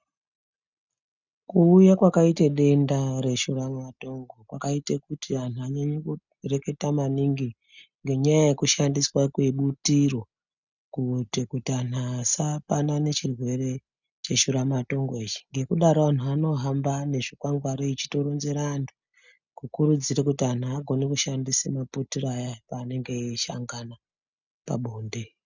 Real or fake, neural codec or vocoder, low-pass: real; none; 7.2 kHz